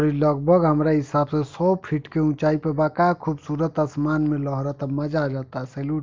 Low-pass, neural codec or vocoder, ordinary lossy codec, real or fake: 7.2 kHz; none; Opus, 32 kbps; real